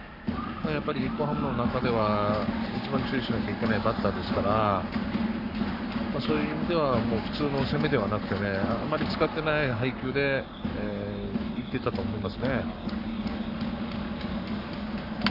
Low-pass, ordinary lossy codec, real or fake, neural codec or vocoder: 5.4 kHz; none; fake; codec, 44.1 kHz, 7.8 kbps, Pupu-Codec